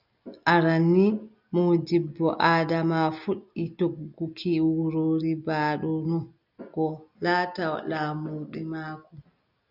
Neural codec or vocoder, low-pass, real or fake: none; 5.4 kHz; real